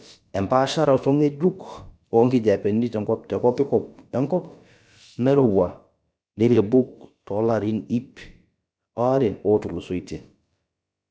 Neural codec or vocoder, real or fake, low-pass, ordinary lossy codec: codec, 16 kHz, about 1 kbps, DyCAST, with the encoder's durations; fake; none; none